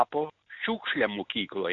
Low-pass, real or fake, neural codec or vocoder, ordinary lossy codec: 7.2 kHz; real; none; MP3, 96 kbps